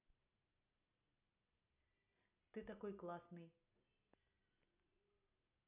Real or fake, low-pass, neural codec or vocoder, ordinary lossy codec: real; 3.6 kHz; none; none